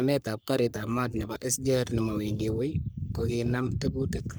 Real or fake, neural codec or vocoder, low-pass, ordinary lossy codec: fake; codec, 44.1 kHz, 3.4 kbps, Pupu-Codec; none; none